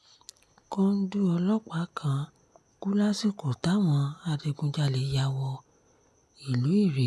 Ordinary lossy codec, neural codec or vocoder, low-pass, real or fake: none; none; none; real